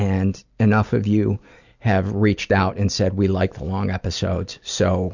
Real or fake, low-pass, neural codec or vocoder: real; 7.2 kHz; none